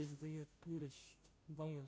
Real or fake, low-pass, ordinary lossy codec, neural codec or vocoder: fake; none; none; codec, 16 kHz, 0.5 kbps, FunCodec, trained on Chinese and English, 25 frames a second